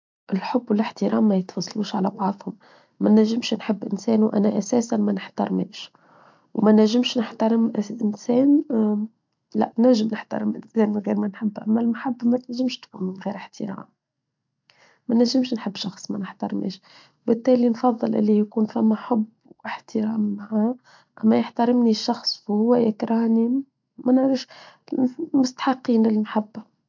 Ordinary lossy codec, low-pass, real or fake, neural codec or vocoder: MP3, 64 kbps; 7.2 kHz; real; none